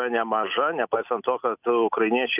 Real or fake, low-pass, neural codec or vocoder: real; 3.6 kHz; none